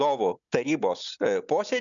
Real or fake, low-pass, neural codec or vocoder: real; 7.2 kHz; none